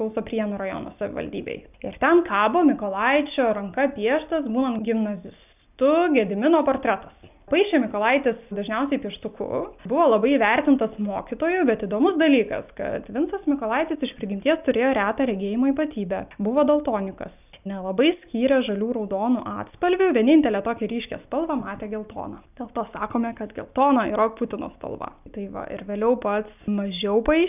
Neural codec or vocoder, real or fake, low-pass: none; real; 3.6 kHz